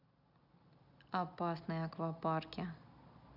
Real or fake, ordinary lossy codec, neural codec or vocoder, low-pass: real; none; none; 5.4 kHz